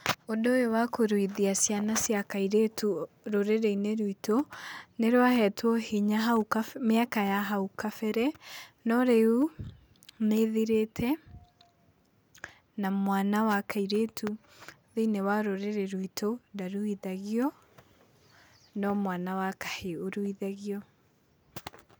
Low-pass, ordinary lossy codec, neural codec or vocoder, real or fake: none; none; none; real